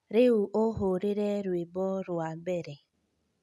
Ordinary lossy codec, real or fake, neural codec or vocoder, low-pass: none; real; none; none